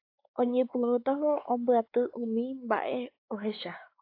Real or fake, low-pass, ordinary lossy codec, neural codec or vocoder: fake; 5.4 kHz; AAC, 32 kbps; codec, 16 kHz, 4 kbps, X-Codec, WavLM features, trained on Multilingual LibriSpeech